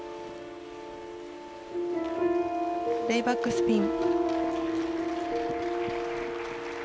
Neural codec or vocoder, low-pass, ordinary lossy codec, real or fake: none; none; none; real